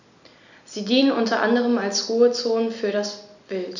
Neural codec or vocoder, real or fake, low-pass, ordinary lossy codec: none; real; 7.2 kHz; none